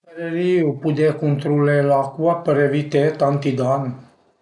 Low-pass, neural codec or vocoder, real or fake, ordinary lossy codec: 10.8 kHz; none; real; none